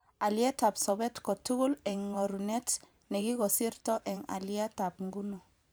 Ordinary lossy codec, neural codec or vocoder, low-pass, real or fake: none; vocoder, 44.1 kHz, 128 mel bands every 512 samples, BigVGAN v2; none; fake